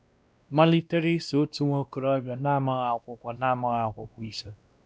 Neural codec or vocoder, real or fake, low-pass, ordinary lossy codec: codec, 16 kHz, 1 kbps, X-Codec, WavLM features, trained on Multilingual LibriSpeech; fake; none; none